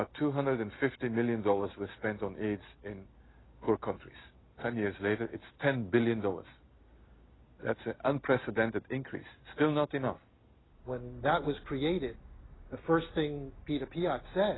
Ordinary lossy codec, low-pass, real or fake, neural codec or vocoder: AAC, 16 kbps; 7.2 kHz; real; none